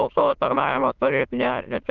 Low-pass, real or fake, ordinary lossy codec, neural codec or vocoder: 7.2 kHz; fake; Opus, 24 kbps; autoencoder, 22.05 kHz, a latent of 192 numbers a frame, VITS, trained on many speakers